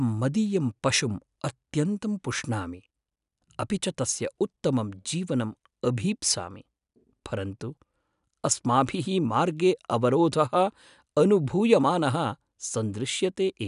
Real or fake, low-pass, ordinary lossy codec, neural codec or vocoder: real; 10.8 kHz; none; none